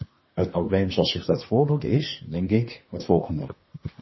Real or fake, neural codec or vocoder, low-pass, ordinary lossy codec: fake; codec, 24 kHz, 1 kbps, SNAC; 7.2 kHz; MP3, 24 kbps